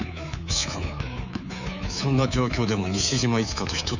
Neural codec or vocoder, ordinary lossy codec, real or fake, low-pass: codec, 24 kHz, 3.1 kbps, DualCodec; none; fake; 7.2 kHz